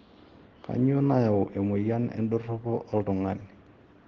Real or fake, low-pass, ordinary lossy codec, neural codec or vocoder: real; 7.2 kHz; Opus, 16 kbps; none